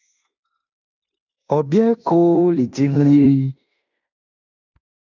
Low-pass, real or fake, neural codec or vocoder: 7.2 kHz; fake; codec, 16 kHz in and 24 kHz out, 0.9 kbps, LongCat-Audio-Codec, fine tuned four codebook decoder